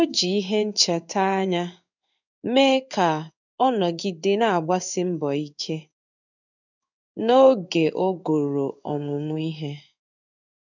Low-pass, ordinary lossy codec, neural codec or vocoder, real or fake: 7.2 kHz; none; codec, 16 kHz in and 24 kHz out, 1 kbps, XY-Tokenizer; fake